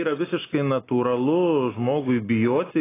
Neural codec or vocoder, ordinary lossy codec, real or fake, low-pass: none; AAC, 16 kbps; real; 3.6 kHz